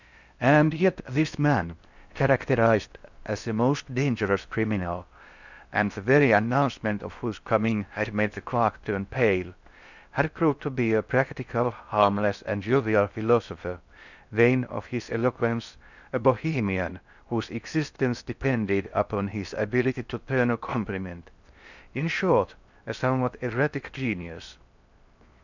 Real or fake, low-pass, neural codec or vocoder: fake; 7.2 kHz; codec, 16 kHz in and 24 kHz out, 0.6 kbps, FocalCodec, streaming, 4096 codes